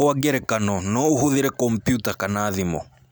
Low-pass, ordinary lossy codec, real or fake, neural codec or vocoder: none; none; real; none